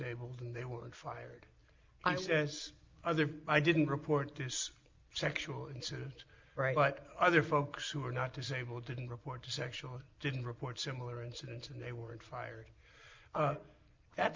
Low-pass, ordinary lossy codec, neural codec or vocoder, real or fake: 7.2 kHz; Opus, 24 kbps; none; real